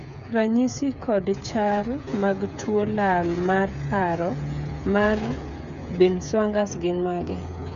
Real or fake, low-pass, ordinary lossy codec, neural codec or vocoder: fake; 7.2 kHz; none; codec, 16 kHz, 8 kbps, FreqCodec, smaller model